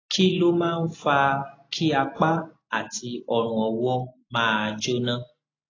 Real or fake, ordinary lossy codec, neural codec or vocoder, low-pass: real; AAC, 32 kbps; none; 7.2 kHz